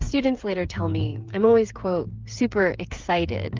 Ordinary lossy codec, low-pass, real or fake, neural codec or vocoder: Opus, 32 kbps; 7.2 kHz; fake; codec, 16 kHz, 8 kbps, FreqCodec, smaller model